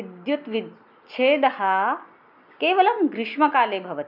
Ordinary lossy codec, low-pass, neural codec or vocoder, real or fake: none; 5.4 kHz; none; real